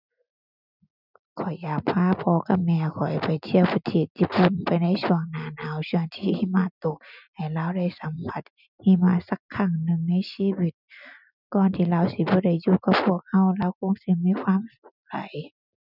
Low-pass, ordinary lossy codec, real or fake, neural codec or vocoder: 5.4 kHz; none; real; none